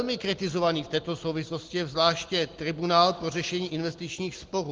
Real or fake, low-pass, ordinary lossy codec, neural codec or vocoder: real; 7.2 kHz; Opus, 16 kbps; none